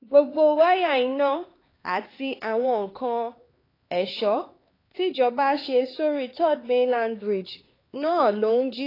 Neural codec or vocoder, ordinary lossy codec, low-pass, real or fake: codec, 16 kHz, 4 kbps, X-Codec, HuBERT features, trained on LibriSpeech; AAC, 24 kbps; 5.4 kHz; fake